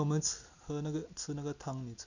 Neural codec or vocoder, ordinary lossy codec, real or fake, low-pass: none; none; real; 7.2 kHz